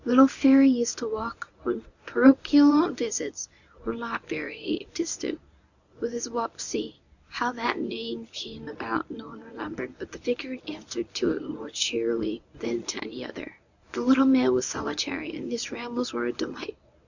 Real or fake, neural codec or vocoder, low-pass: fake; codec, 24 kHz, 0.9 kbps, WavTokenizer, medium speech release version 1; 7.2 kHz